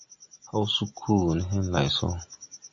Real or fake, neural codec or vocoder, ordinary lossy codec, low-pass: real; none; MP3, 48 kbps; 7.2 kHz